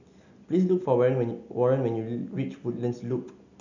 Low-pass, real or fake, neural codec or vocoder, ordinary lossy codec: 7.2 kHz; real; none; none